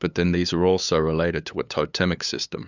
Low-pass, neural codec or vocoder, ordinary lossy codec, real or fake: 7.2 kHz; codec, 16 kHz, 8 kbps, FunCodec, trained on LibriTTS, 25 frames a second; Opus, 64 kbps; fake